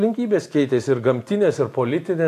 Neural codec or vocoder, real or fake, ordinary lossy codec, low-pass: none; real; AAC, 64 kbps; 14.4 kHz